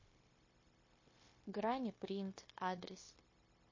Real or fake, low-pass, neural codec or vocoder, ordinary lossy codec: fake; 7.2 kHz; codec, 16 kHz, 0.9 kbps, LongCat-Audio-Codec; MP3, 32 kbps